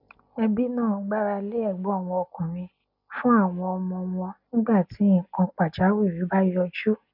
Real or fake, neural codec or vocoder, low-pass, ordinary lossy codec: real; none; 5.4 kHz; none